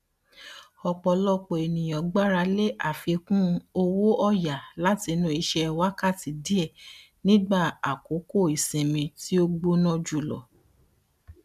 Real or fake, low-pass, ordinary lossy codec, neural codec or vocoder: real; 14.4 kHz; none; none